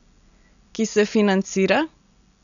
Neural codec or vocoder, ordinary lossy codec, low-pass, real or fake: none; none; 7.2 kHz; real